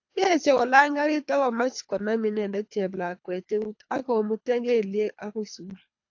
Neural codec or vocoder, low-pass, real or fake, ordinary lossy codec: codec, 24 kHz, 3 kbps, HILCodec; 7.2 kHz; fake; AAC, 48 kbps